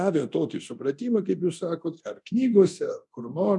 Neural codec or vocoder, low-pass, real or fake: codec, 24 kHz, 0.9 kbps, DualCodec; 10.8 kHz; fake